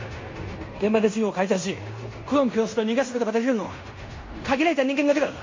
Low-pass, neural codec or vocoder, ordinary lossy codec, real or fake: 7.2 kHz; codec, 16 kHz in and 24 kHz out, 0.9 kbps, LongCat-Audio-Codec, fine tuned four codebook decoder; MP3, 32 kbps; fake